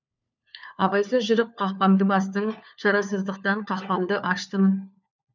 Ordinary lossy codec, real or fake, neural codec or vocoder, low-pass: none; fake; codec, 16 kHz, 4 kbps, FunCodec, trained on LibriTTS, 50 frames a second; 7.2 kHz